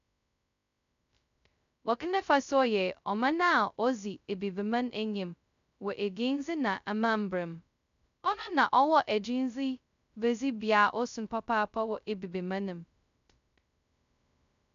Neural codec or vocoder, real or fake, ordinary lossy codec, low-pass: codec, 16 kHz, 0.2 kbps, FocalCodec; fake; none; 7.2 kHz